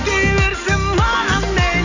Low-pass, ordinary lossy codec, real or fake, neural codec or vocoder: 7.2 kHz; none; real; none